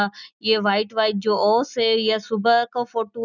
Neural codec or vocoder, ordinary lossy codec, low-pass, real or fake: none; none; 7.2 kHz; real